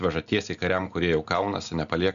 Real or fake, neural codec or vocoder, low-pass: real; none; 7.2 kHz